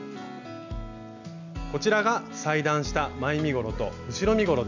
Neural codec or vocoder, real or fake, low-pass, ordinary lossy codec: none; real; 7.2 kHz; none